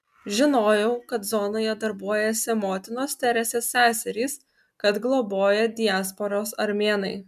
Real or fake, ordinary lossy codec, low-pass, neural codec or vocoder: real; MP3, 96 kbps; 14.4 kHz; none